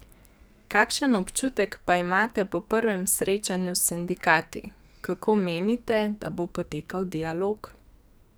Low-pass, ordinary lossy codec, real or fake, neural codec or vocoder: none; none; fake; codec, 44.1 kHz, 2.6 kbps, SNAC